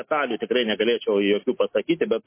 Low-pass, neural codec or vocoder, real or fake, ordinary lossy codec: 3.6 kHz; none; real; MP3, 24 kbps